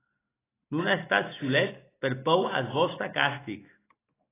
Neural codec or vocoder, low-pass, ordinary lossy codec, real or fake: none; 3.6 kHz; AAC, 16 kbps; real